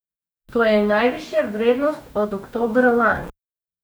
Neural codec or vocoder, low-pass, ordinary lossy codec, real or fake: codec, 44.1 kHz, 2.6 kbps, DAC; none; none; fake